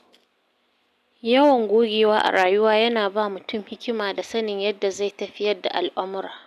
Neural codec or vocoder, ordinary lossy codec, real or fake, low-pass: none; none; real; 14.4 kHz